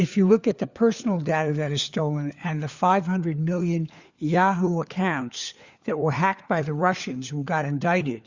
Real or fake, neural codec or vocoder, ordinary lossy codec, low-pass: fake; codec, 16 kHz, 4 kbps, FunCodec, trained on LibriTTS, 50 frames a second; Opus, 64 kbps; 7.2 kHz